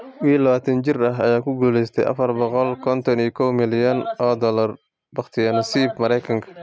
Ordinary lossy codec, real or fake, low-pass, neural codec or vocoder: none; real; none; none